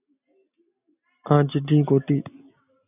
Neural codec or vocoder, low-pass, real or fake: none; 3.6 kHz; real